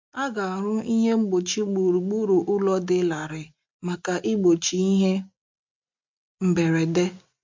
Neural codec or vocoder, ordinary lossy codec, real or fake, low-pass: none; MP3, 48 kbps; real; 7.2 kHz